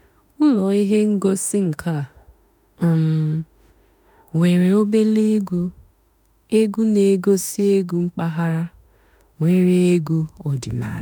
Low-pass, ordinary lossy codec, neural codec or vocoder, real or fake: none; none; autoencoder, 48 kHz, 32 numbers a frame, DAC-VAE, trained on Japanese speech; fake